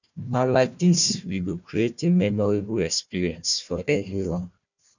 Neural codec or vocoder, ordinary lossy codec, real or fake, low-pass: codec, 16 kHz, 1 kbps, FunCodec, trained on Chinese and English, 50 frames a second; none; fake; 7.2 kHz